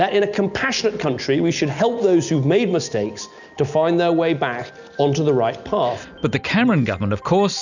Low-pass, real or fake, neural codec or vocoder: 7.2 kHz; real; none